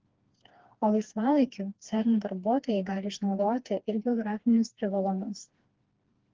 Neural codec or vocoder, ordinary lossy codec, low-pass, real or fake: codec, 16 kHz, 2 kbps, FreqCodec, smaller model; Opus, 16 kbps; 7.2 kHz; fake